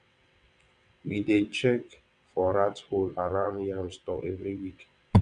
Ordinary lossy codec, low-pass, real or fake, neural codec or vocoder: none; 9.9 kHz; fake; vocoder, 22.05 kHz, 80 mel bands, WaveNeXt